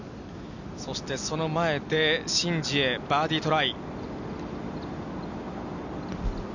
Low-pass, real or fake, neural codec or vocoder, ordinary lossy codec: 7.2 kHz; real; none; none